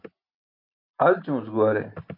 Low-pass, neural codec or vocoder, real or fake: 5.4 kHz; none; real